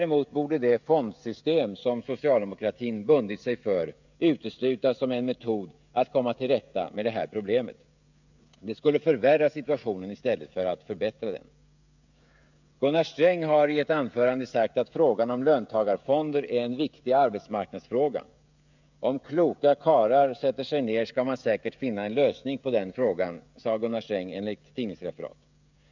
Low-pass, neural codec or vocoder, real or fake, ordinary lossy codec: 7.2 kHz; codec, 16 kHz, 16 kbps, FreqCodec, smaller model; fake; none